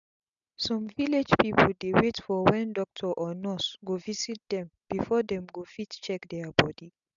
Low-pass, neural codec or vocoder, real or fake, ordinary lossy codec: 7.2 kHz; none; real; none